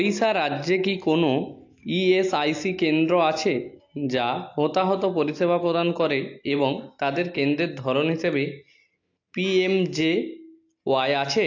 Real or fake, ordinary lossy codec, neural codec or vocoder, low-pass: real; AAC, 48 kbps; none; 7.2 kHz